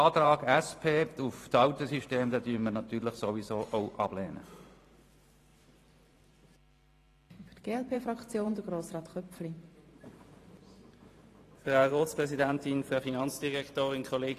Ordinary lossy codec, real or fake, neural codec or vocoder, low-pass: AAC, 48 kbps; fake; vocoder, 44.1 kHz, 128 mel bands every 512 samples, BigVGAN v2; 14.4 kHz